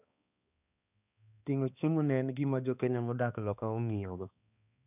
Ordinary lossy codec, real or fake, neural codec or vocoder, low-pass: none; fake; codec, 16 kHz, 2 kbps, X-Codec, HuBERT features, trained on balanced general audio; 3.6 kHz